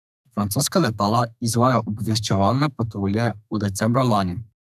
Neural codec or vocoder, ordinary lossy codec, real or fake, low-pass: codec, 32 kHz, 1.9 kbps, SNAC; none; fake; 14.4 kHz